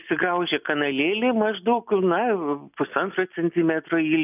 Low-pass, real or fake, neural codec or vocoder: 3.6 kHz; real; none